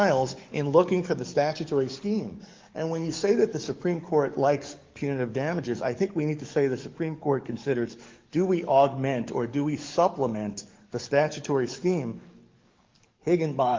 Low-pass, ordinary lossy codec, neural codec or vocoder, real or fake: 7.2 kHz; Opus, 32 kbps; codec, 44.1 kHz, 7.8 kbps, DAC; fake